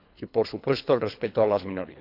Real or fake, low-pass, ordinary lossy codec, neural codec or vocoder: fake; 5.4 kHz; none; codec, 24 kHz, 3 kbps, HILCodec